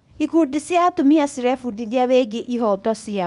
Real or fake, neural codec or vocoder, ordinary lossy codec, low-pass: fake; codec, 24 kHz, 0.9 kbps, WavTokenizer, small release; none; 10.8 kHz